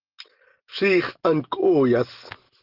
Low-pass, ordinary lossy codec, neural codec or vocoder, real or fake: 5.4 kHz; Opus, 16 kbps; none; real